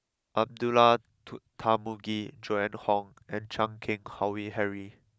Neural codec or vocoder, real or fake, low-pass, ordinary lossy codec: none; real; none; none